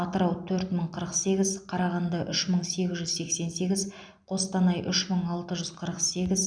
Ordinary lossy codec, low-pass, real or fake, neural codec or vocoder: none; 9.9 kHz; real; none